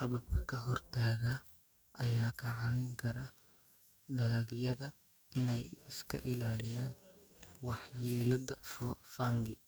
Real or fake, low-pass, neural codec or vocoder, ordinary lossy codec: fake; none; codec, 44.1 kHz, 2.6 kbps, DAC; none